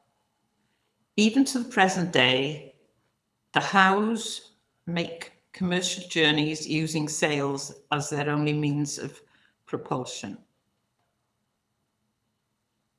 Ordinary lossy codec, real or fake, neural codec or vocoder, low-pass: none; fake; codec, 24 kHz, 6 kbps, HILCodec; none